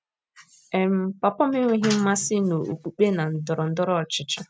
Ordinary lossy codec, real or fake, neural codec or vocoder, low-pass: none; real; none; none